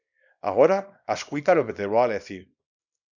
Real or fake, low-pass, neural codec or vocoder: fake; 7.2 kHz; codec, 24 kHz, 0.9 kbps, WavTokenizer, small release